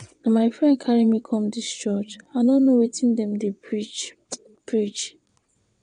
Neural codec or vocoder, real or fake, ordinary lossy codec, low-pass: vocoder, 22.05 kHz, 80 mel bands, WaveNeXt; fake; none; 9.9 kHz